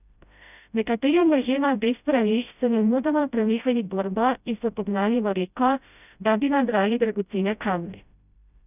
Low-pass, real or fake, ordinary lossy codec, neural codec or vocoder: 3.6 kHz; fake; none; codec, 16 kHz, 0.5 kbps, FreqCodec, smaller model